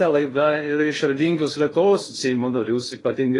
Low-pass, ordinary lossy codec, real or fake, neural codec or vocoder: 10.8 kHz; AAC, 32 kbps; fake; codec, 16 kHz in and 24 kHz out, 0.6 kbps, FocalCodec, streaming, 2048 codes